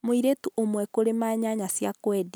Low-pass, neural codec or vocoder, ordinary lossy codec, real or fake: none; none; none; real